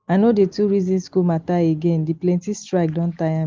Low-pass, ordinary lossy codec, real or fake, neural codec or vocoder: 7.2 kHz; Opus, 32 kbps; real; none